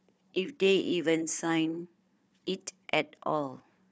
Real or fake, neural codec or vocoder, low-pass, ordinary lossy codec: fake; codec, 16 kHz, 4 kbps, FunCodec, trained on Chinese and English, 50 frames a second; none; none